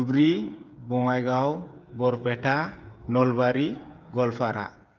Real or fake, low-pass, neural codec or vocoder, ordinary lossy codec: fake; 7.2 kHz; codec, 16 kHz, 8 kbps, FreqCodec, smaller model; Opus, 24 kbps